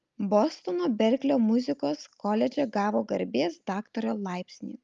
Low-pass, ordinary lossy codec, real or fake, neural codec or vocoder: 7.2 kHz; Opus, 32 kbps; real; none